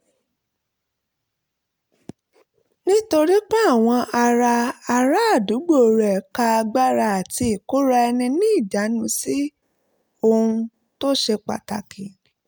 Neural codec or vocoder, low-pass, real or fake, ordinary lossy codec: none; none; real; none